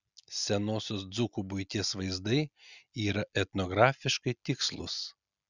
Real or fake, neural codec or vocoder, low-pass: real; none; 7.2 kHz